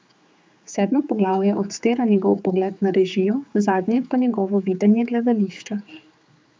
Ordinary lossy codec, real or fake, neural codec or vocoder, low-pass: none; fake; codec, 16 kHz, 4 kbps, X-Codec, HuBERT features, trained on general audio; none